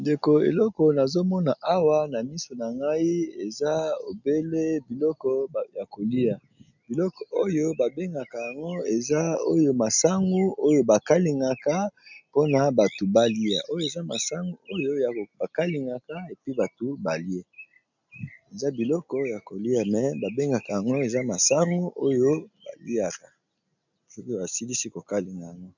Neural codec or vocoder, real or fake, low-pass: none; real; 7.2 kHz